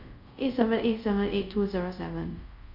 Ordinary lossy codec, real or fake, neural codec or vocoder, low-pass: none; fake; codec, 24 kHz, 0.5 kbps, DualCodec; 5.4 kHz